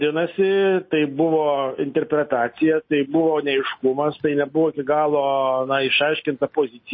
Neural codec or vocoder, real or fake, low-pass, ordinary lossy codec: none; real; 7.2 kHz; MP3, 24 kbps